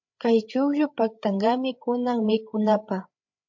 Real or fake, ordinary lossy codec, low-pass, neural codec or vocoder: fake; MP3, 48 kbps; 7.2 kHz; codec, 16 kHz, 8 kbps, FreqCodec, larger model